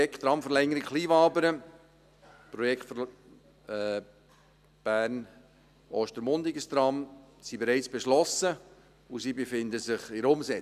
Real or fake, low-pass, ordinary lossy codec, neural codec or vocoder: real; 14.4 kHz; none; none